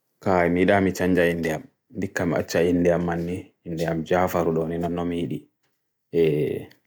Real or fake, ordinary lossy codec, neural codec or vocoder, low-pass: real; none; none; none